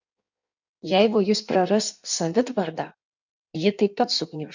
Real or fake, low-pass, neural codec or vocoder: fake; 7.2 kHz; codec, 16 kHz in and 24 kHz out, 1.1 kbps, FireRedTTS-2 codec